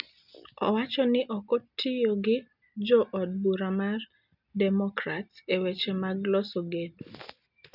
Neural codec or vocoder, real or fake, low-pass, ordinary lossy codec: none; real; 5.4 kHz; none